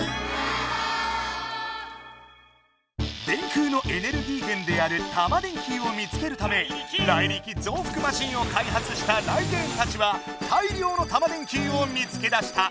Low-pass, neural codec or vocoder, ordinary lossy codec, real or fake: none; none; none; real